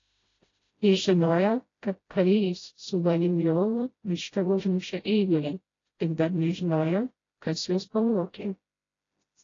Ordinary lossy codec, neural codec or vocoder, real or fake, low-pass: AAC, 32 kbps; codec, 16 kHz, 0.5 kbps, FreqCodec, smaller model; fake; 7.2 kHz